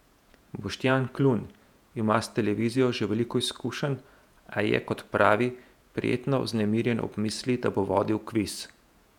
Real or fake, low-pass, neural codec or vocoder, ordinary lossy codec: fake; 19.8 kHz; vocoder, 48 kHz, 128 mel bands, Vocos; none